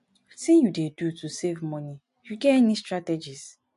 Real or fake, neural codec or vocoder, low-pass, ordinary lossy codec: real; none; 10.8 kHz; MP3, 64 kbps